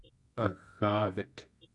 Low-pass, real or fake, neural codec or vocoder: 10.8 kHz; fake; codec, 24 kHz, 0.9 kbps, WavTokenizer, medium music audio release